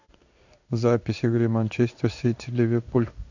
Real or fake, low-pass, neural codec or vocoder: fake; 7.2 kHz; codec, 16 kHz in and 24 kHz out, 1 kbps, XY-Tokenizer